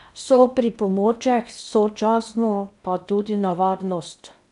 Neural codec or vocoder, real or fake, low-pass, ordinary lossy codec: codec, 16 kHz in and 24 kHz out, 0.8 kbps, FocalCodec, streaming, 65536 codes; fake; 10.8 kHz; none